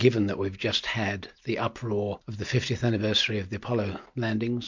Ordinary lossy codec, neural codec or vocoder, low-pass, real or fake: MP3, 48 kbps; none; 7.2 kHz; real